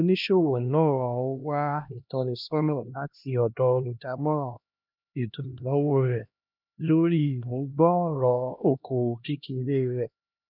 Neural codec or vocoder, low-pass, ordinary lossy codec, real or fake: codec, 16 kHz, 1 kbps, X-Codec, HuBERT features, trained on LibriSpeech; 5.4 kHz; none; fake